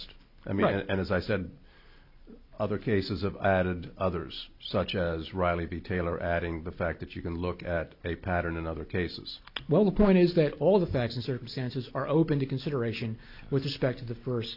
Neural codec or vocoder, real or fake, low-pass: none; real; 5.4 kHz